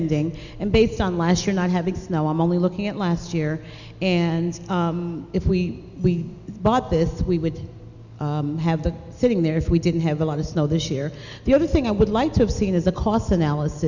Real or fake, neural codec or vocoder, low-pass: real; none; 7.2 kHz